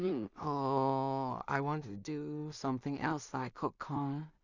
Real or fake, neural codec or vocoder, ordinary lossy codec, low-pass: fake; codec, 16 kHz in and 24 kHz out, 0.4 kbps, LongCat-Audio-Codec, two codebook decoder; none; 7.2 kHz